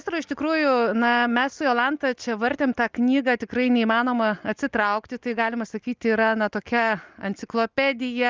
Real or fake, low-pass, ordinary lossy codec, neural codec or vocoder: real; 7.2 kHz; Opus, 24 kbps; none